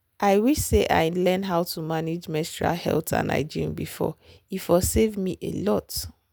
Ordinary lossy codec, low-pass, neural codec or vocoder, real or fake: none; none; none; real